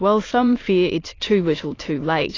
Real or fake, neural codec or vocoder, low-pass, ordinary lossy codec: fake; autoencoder, 22.05 kHz, a latent of 192 numbers a frame, VITS, trained on many speakers; 7.2 kHz; AAC, 32 kbps